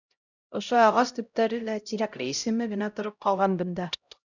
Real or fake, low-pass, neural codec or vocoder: fake; 7.2 kHz; codec, 16 kHz, 0.5 kbps, X-Codec, HuBERT features, trained on LibriSpeech